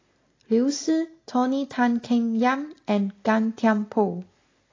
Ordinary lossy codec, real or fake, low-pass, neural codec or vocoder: AAC, 32 kbps; real; 7.2 kHz; none